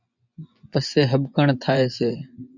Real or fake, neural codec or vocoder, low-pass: fake; vocoder, 24 kHz, 100 mel bands, Vocos; 7.2 kHz